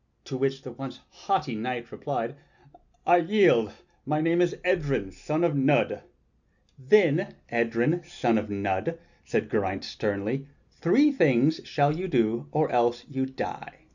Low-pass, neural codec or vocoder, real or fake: 7.2 kHz; none; real